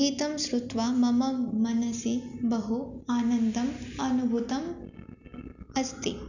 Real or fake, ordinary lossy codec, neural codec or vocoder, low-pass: real; none; none; 7.2 kHz